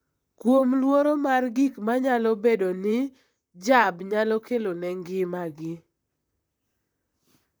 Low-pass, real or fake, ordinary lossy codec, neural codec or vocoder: none; fake; none; vocoder, 44.1 kHz, 128 mel bands, Pupu-Vocoder